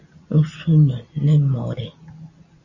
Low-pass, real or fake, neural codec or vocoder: 7.2 kHz; real; none